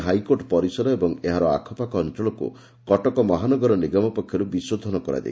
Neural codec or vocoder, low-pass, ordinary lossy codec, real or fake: none; none; none; real